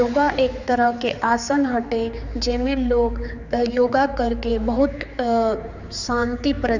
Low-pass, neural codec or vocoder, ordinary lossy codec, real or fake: 7.2 kHz; codec, 16 kHz, 4 kbps, X-Codec, HuBERT features, trained on general audio; none; fake